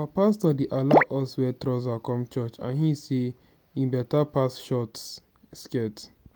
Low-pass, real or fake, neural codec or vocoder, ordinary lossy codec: none; real; none; none